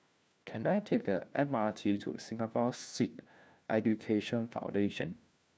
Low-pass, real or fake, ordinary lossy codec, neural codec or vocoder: none; fake; none; codec, 16 kHz, 1 kbps, FunCodec, trained on LibriTTS, 50 frames a second